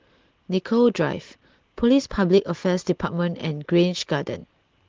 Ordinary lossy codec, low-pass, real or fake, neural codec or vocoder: Opus, 16 kbps; 7.2 kHz; real; none